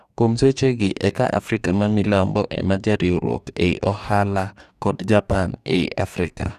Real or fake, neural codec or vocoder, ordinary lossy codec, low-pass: fake; codec, 44.1 kHz, 2.6 kbps, DAC; none; 14.4 kHz